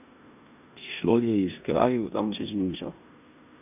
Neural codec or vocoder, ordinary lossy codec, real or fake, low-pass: codec, 16 kHz in and 24 kHz out, 0.9 kbps, LongCat-Audio-Codec, four codebook decoder; none; fake; 3.6 kHz